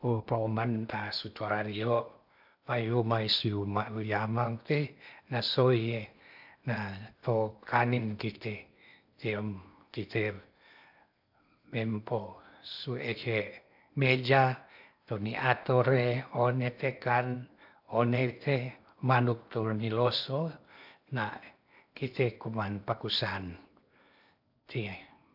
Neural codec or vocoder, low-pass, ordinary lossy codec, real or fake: codec, 16 kHz in and 24 kHz out, 0.8 kbps, FocalCodec, streaming, 65536 codes; 5.4 kHz; AAC, 48 kbps; fake